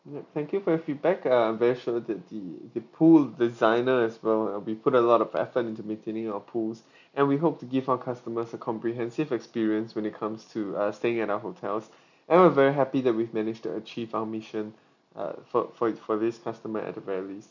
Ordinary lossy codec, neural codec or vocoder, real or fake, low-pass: none; none; real; 7.2 kHz